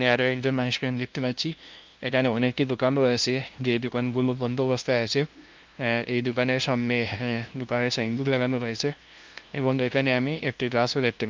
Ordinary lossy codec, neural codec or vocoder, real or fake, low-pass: Opus, 24 kbps; codec, 16 kHz, 0.5 kbps, FunCodec, trained on LibriTTS, 25 frames a second; fake; 7.2 kHz